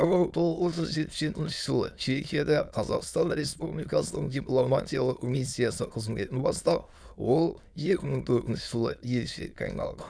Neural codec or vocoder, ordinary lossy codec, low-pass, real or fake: autoencoder, 22.05 kHz, a latent of 192 numbers a frame, VITS, trained on many speakers; none; none; fake